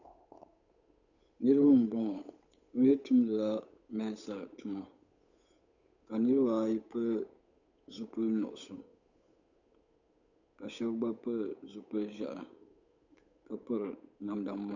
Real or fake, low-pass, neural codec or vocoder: fake; 7.2 kHz; codec, 16 kHz, 8 kbps, FunCodec, trained on Chinese and English, 25 frames a second